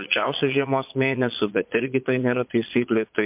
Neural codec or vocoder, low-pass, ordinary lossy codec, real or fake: vocoder, 22.05 kHz, 80 mel bands, Vocos; 3.6 kHz; MP3, 32 kbps; fake